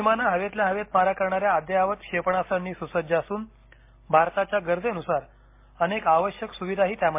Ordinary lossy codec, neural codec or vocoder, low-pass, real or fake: MP3, 24 kbps; none; 3.6 kHz; real